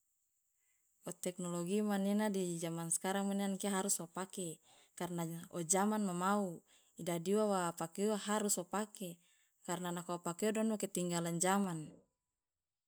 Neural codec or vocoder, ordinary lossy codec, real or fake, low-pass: none; none; real; none